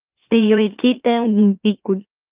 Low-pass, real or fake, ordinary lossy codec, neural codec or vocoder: 3.6 kHz; fake; Opus, 32 kbps; autoencoder, 44.1 kHz, a latent of 192 numbers a frame, MeloTTS